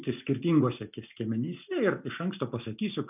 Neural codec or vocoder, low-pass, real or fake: none; 3.6 kHz; real